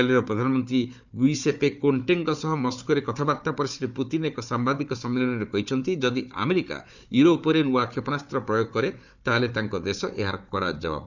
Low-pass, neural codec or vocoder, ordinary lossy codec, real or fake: 7.2 kHz; codec, 16 kHz, 4 kbps, FunCodec, trained on Chinese and English, 50 frames a second; none; fake